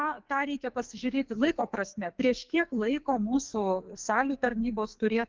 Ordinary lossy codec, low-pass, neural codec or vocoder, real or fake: Opus, 32 kbps; 7.2 kHz; codec, 44.1 kHz, 2.6 kbps, SNAC; fake